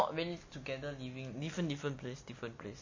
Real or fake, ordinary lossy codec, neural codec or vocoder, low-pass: real; MP3, 32 kbps; none; 7.2 kHz